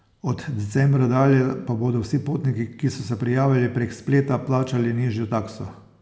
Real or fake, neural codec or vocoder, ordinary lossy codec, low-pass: real; none; none; none